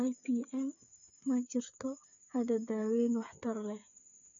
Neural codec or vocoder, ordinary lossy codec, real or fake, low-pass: codec, 16 kHz, 8 kbps, FreqCodec, smaller model; AAC, 64 kbps; fake; 7.2 kHz